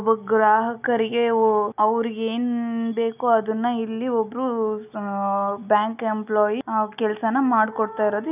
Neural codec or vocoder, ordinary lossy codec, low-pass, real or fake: none; none; 3.6 kHz; real